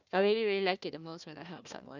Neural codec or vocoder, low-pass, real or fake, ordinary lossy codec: codec, 16 kHz, 1 kbps, FunCodec, trained on Chinese and English, 50 frames a second; 7.2 kHz; fake; none